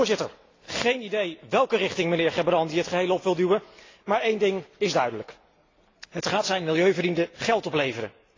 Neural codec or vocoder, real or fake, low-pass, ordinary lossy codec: none; real; 7.2 kHz; AAC, 32 kbps